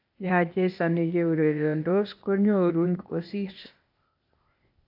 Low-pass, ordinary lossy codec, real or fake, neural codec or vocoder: 5.4 kHz; AAC, 48 kbps; fake; codec, 16 kHz, 0.8 kbps, ZipCodec